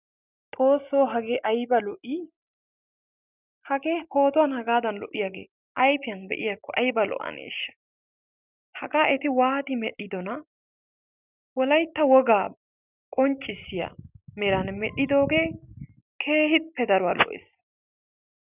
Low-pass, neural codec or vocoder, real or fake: 3.6 kHz; none; real